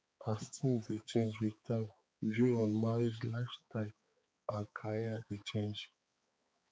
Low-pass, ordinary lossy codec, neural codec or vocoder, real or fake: none; none; codec, 16 kHz, 4 kbps, X-Codec, HuBERT features, trained on balanced general audio; fake